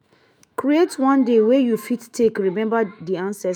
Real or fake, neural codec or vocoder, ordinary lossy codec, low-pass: fake; autoencoder, 48 kHz, 128 numbers a frame, DAC-VAE, trained on Japanese speech; none; none